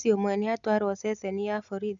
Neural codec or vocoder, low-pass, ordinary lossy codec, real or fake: none; 7.2 kHz; MP3, 96 kbps; real